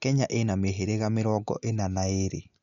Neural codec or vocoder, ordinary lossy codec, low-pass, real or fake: none; none; 7.2 kHz; real